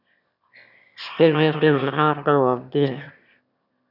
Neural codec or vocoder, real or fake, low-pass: autoencoder, 22.05 kHz, a latent of 192 numbers a frame, VITS, trained on one speaker; fake; 5.4 kHz